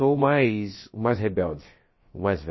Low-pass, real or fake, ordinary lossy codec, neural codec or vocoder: 7.2 kHz; fake; MP3, 24 kbps; codec, 16 kHz, about 1 kbps, DyCAST, with the encoder's durations